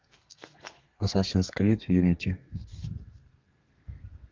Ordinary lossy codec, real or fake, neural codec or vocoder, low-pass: Opus, 24 kbps; fake; codec, 32 kHz, 1.9 kbps, SNAC; 7.2 kHz